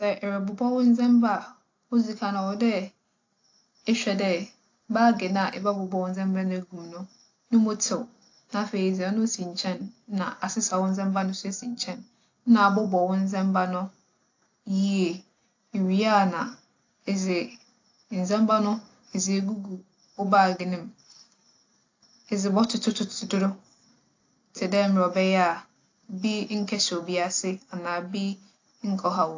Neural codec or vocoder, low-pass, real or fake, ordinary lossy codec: none; 7.2 kHz; real; AAC, 48 kbps